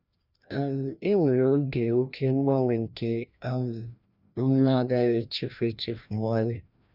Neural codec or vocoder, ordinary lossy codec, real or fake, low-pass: codec, 16 kHz, 1 kbps, FreqCodec, larger model; none; fake; 5.4 kHz